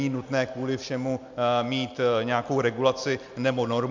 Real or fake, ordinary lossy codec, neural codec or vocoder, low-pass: real; MP3, 64 kbps; none; 7.2 kHz